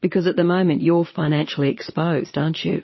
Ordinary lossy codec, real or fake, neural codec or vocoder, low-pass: MP3, 24 kbps; fake; vocoder, 22.05 kHz, 80 mel bands, Vocos; 7.2 kHz